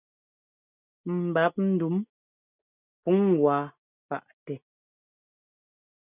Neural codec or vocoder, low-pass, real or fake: none; 3.6 kHz; real